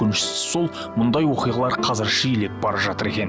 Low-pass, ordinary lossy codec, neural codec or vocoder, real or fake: none; none; none; real